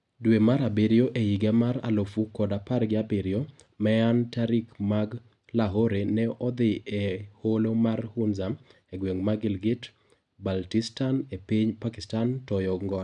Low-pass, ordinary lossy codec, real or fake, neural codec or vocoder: 10.8 kHz; none; real; none